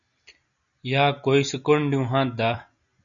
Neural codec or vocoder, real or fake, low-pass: none; real; 7.2 kHz